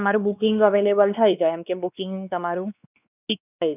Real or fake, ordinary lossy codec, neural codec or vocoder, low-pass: fake; none; codec, 16 kHz, 2 kbps, X-Codec, WavLM features, trained on Multilingual LibriSpeech; 3.6 kHz